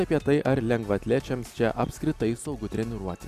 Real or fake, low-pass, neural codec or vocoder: real; 14.4 kHz; none